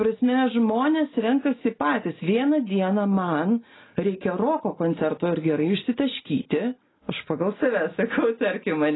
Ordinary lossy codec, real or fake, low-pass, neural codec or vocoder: AAC, 16 kbps; real; 7.2 kHz; none